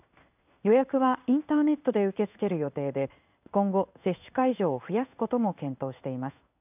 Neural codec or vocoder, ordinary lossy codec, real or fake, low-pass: codec, 16 kHz in and 24 kHz out, 1 kbps, XY-Tokenizer; none; fake; 3.6 kHz